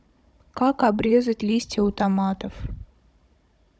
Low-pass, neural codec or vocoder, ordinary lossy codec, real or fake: none; codec, 16 kHz, 16 kbps, FunCodec, trained on Chinese and English, 50 frames a second; none; fake